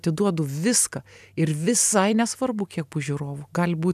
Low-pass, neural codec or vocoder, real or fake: 14.4 kHz; none; real